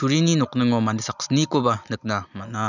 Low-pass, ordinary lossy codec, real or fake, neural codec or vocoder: 7.2 kHz; none; real; none